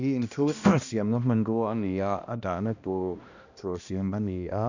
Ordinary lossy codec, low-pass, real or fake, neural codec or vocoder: none; 7.2 kHz; fake; codec, 16 kHz, 1 kbps, X-Codec, HuBERT features, trained on balanced general audio